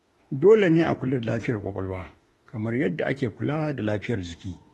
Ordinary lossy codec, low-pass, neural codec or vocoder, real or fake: AAC, 32 kbps; 19.8 kHz; autoencoder, 48 kHz, 32 numbers a frame, DAC-VAE, trained on Japanese speech; fake